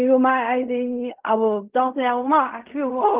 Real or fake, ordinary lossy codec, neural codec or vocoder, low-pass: fake; Opus, 24 kbps; codec, 16 kHz in and 24 kHz out, 0.4 kbps, LongCat-Audio-Codec, fine tuned four codebook decoder; 3.6 kHz